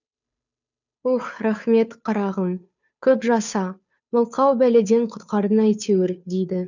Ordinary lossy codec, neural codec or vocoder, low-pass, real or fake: none; codec, 16 kHz, 2 kbps, FunCodec, trained on Chinese and English, 25 frames a second; 7.2 kHz; fake